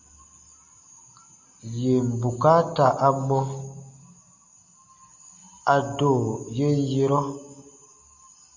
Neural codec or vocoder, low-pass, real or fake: none; 7.2 kHz; real